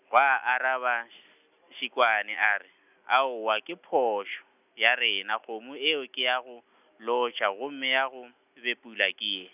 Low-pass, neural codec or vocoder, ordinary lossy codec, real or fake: 3.6 kHz; none; none; real